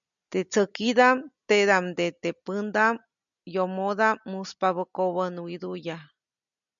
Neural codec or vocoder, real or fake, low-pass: none; real; 7.2 kHz